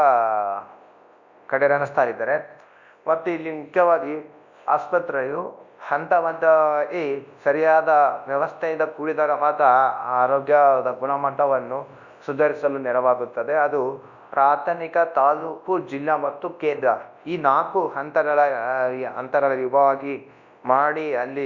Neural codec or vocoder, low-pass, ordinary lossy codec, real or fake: codec, 24 kHz, 0.9 kbps, WavTokenizer, large speech release; 7.2 kHz; Opus, 64 kbps; fake